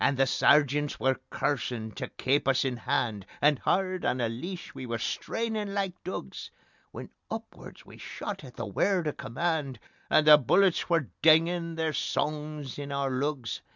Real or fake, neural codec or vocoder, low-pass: real; none; 7.2 kHz